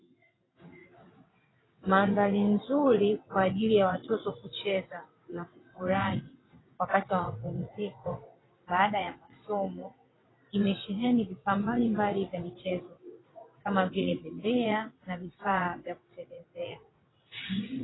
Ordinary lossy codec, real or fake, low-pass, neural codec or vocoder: AAC, 16 kbps; fake; 7.2 kHz; vocoder, 22.05 kHz, 80 mel bands, WaveNeXt